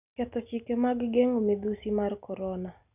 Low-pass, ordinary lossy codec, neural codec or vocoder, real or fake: 3.6 kHz; none; none; real